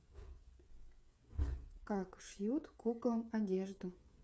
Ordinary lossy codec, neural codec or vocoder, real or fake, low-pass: none; codec, 16 kHz, 8 kbps, FreqCodec, smaller model; fake; none